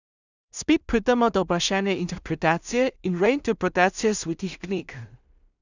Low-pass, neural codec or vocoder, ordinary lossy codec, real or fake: 7.2 kHz; codec, 16 kHz in and 24 kHz out, 0.4 kbps, LongCat-Audio-Codec, two codebook decoder; none; fake